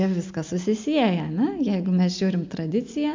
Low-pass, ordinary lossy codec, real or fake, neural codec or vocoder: 7.2 kHz; MP3, 64 kbps; real; none